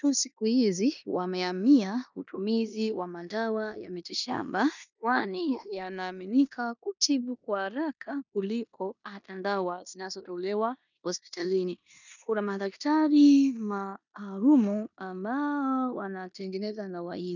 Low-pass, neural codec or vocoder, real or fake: 7.2 kHz; codec, 16 kHz in and 24 kHz out, 0.9 kbps, LongCat-Audio-Codec, four codebook decoder; fake